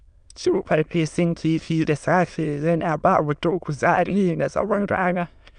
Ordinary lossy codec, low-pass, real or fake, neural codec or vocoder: none; 9.9 kHz; fake; autoencoder, 22.05 kHz, a latent of 192 numbers a frame, VITS, trained on many speakers